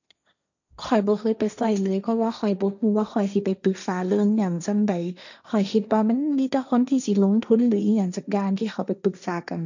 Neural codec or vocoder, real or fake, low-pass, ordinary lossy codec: codec, 16 kHz, 1.1 kbps, Voila-Tokenizer; fake; 7.2 kHz; none